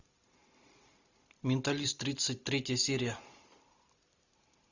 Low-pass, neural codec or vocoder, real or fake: 7.2 kHz; none; real